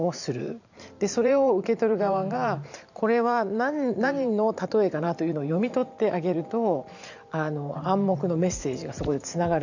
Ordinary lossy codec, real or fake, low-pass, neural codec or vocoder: none; fake; 7.2 kHz; vocoder, 44.1 kHz, 128 mel bands every 512 samples, BigVGAN v2